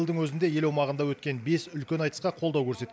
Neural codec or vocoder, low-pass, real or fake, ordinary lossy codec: none; none; real; none